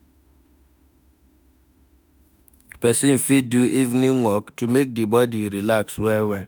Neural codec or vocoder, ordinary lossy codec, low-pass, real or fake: autoencoder, 48 kHz, 32 numbers a frame, DAC-VAE, trained on Japanese speech; none; none; fake